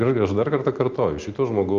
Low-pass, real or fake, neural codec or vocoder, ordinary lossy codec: 7.2 kHz; real; none; Opus, 24 kbps